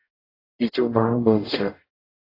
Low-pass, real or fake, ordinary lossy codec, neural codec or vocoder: 5.4 kHz; fake; AAC, 32 kbps; codec, 44.1 kHz, 0.9 kbps, DAC